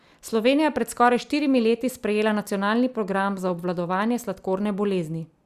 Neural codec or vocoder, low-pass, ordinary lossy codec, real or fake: none; 14.4 kHz; Opus, 64 kbps; real